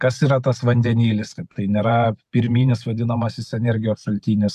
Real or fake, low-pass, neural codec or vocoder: fake; 14.4 kHz; vocoder, 44.1 kHz, 128 mel bands every 512 samples, BigVGAN v2